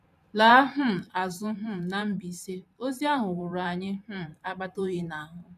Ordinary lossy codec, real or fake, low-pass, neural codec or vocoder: none; fake; 14.4 kHz; vocoder, 44.1 kHz, 128 mel bands every 512 samples, BigVGAN v2